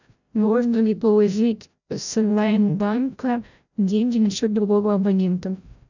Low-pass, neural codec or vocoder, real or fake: 7.2 kHz; codec, 16 kHz, 0.5 kbps, FreqCodec, larger model; fake